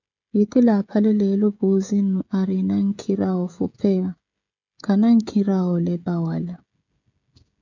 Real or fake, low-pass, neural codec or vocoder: fake; 7.2 kHz; codec, 16 kHz, 16 kbps, FreqCodec, smaller model